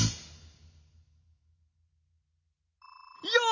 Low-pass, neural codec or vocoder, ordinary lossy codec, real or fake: 7.2 kHz; none; none; real